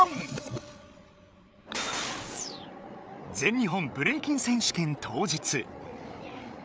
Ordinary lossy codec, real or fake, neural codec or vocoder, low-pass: none; fake; codec, 16 kHz, 8 kbps, FreqCodec, larger model; none